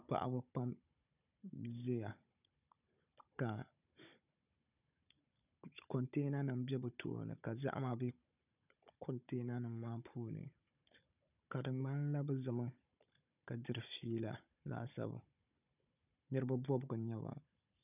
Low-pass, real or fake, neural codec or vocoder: 3.6 kHz; fake; codec, 16 kHz, 16 kbps, FunCodec, trained on Chinese and English, 50 frames a second